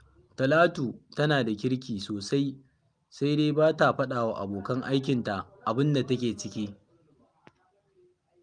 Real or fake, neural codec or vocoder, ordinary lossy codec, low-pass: real; none; Opus, 32 kbps; 9.9 kHz